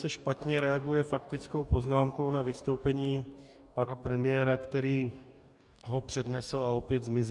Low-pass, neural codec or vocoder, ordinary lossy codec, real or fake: 10.8 kHz; codec, 44.1 kHz, 2.6 kbps, DAC; MP3, 96 kbps; fake